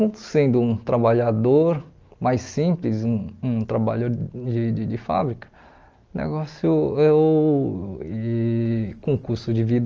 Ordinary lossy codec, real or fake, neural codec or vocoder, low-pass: Opus, 24 kbps; real; none; 7.2 kHz